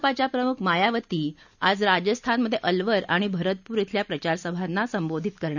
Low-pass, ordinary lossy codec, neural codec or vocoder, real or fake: 7.2 kHz; none; none; real